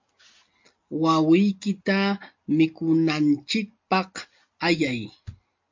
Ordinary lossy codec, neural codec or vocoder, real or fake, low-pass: MP3, 48 kbps; none; real; 7.2 kHz